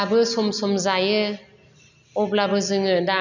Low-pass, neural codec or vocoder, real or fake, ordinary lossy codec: 7.2 kHz; none; real; none